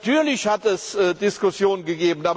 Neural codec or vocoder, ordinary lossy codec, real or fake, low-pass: none; none; real; none